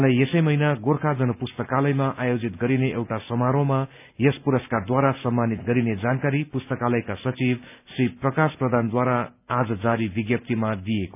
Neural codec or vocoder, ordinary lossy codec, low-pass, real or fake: none; none; 3.6 kHz; real